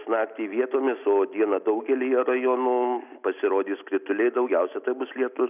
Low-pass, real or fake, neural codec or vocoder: 3.6 kHz; real; none